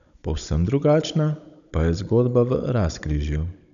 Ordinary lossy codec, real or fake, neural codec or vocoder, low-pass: none; fake; codec, 16 kHz, 16 kbps, FunCodec, trained on Chinese and English, 50 frames a second; 7.2 kHz